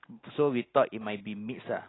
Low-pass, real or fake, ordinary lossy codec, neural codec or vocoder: 7.2 kHz; real; AAC, 16 kbps; none